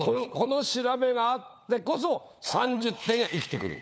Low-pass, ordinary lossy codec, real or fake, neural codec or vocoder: none; none; fake; codec, 16 kHz, 4 kbps, FunCodec, trained on LibriTTS, 50 frames a second